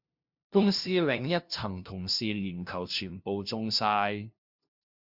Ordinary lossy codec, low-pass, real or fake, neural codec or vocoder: Opus, 64 kbps; 5.4 kHz; fake; codec, 16 kHz, 0.5 kbps, FunCodec, trained on LibriTTS, 25 frames a second